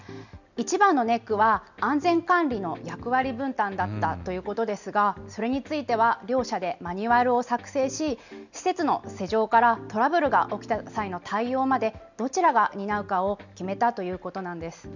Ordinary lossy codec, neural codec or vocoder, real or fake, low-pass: none; none; real; 7.2 kHz